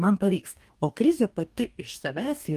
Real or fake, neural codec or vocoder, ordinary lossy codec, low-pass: fake; codec, 44.1 kHz, 2.6 kbps, DAC; Opus, 32 kbps; 14.4 kHz